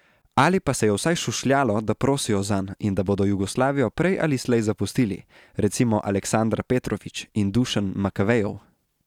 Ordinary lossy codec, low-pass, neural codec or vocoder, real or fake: none; 19.8 kHz; none; real